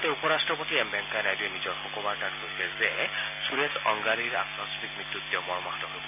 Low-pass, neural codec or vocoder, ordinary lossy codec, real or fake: 3.6 kHz; none; MP3, 24 kbps; real